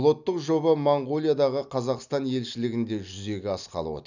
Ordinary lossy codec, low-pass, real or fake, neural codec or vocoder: none; 7.2 kHz; real; none